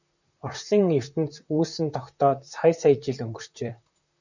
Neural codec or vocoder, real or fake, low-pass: vocoder, 44.1 kHz, 128 mel bands, Pupu-Vocoder; fake; 7.2 kHz